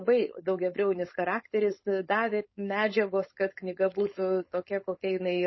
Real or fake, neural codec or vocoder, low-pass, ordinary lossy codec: fake; codec, 16 kHz, 4.8 kbps, FACodec; 7.2 kHz; MP3, 24 kbps